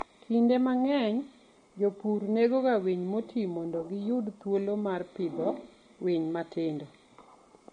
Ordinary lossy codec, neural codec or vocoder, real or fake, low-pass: MP3, 48 kbps; none; real; 9.9 kHz